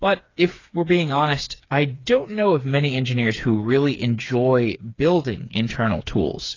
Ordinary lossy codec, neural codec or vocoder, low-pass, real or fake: AAC, 32 kbps; codec, 16 kHz, 4 kbps, FreqCodec, smaller model; 7.2 kHz; fake